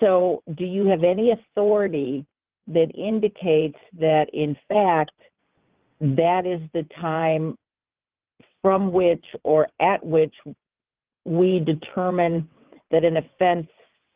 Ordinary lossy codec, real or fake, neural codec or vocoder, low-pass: Opus, 32 kbps; fake; vocoder, 22.05 kHz, 80 mel bands, WaveNeXt; 3.6 kHz